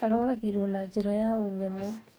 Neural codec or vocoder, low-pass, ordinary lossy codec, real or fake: codec, 44.1 kHz, 2.6 kbps, DAC; none; none; fake